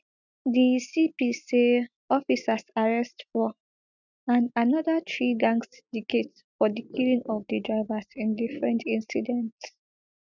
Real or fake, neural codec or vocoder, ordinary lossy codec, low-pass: real; none; none; 7.2 kHz